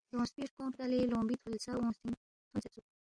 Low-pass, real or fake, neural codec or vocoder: 9.9 kHz; real; none